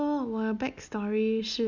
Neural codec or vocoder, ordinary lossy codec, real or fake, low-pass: none; none; real; 7.2 kHz